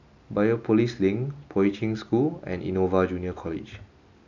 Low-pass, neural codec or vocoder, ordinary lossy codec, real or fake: 7.2 kHz; none; none; real